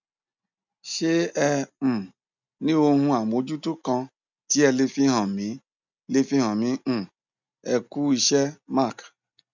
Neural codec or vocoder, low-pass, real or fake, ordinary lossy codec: none; 7.2 kHz; real; none